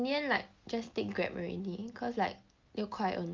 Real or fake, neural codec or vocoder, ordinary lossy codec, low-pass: real; none; Opus, 24 kbps; 7.2 kHz